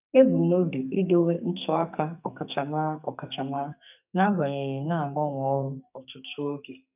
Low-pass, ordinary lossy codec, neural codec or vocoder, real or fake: 3.6 kHz; none; codec, 44.1 kHz, 2.6 kbps, SNAC; fake